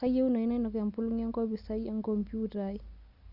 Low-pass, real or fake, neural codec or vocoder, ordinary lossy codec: 5.4 kHz; real; none; Opus, 64 kbps